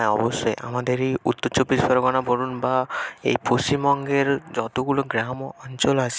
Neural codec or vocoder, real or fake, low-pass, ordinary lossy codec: none; real; none; none